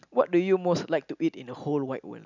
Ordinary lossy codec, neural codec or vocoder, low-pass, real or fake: none; none; 7.2 kHz; real